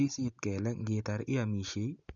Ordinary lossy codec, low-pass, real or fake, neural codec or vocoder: none; 7.2 kHz; real; none